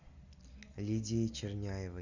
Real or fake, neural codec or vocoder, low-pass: real; none; 7.2 kHz